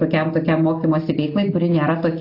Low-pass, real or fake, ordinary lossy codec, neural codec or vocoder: 5.4 kHz; real; AAC, 32 kbps; none